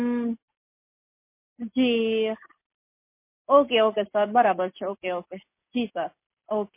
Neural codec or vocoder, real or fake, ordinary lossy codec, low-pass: none; real; MP3, 24 kbps; 3.6 kHz